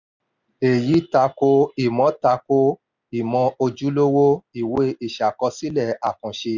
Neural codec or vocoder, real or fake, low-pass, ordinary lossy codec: none; real; 7.2 kHz; none